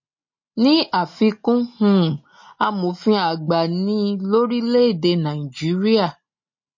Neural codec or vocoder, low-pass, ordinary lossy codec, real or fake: none; 7.2 kHz; MP3, 32 kbps; real